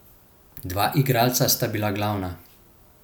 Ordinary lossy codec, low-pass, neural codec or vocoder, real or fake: none; none; none; real